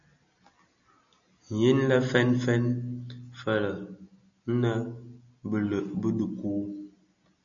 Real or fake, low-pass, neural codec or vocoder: real; 7.2 kHz; none